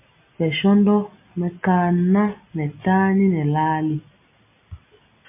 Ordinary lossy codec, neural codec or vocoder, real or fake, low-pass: MP3, 32 kbps; none; real; 3.6 kHz